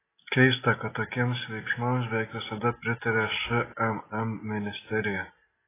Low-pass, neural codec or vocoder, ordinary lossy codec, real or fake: 3.6 kHz; none; AAC, 16 kbps; real